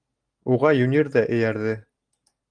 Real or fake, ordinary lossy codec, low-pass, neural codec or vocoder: real; Opus, 24 kbps; 9.9 kHz; none